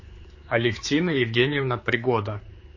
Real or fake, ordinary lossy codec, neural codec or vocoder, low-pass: fake; MP3, 32 kbps; codec, 16 kHz, 4 kbps, X-Codec, HuBERT features, trained on general audio; 7.2 kHz